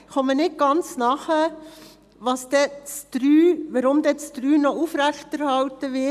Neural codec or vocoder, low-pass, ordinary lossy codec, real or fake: none; 14.4 kHz; none; real